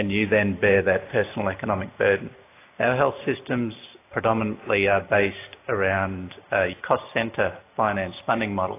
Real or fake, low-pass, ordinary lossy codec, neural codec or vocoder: real; 3.6 kHz; AAC, 24 kbps; none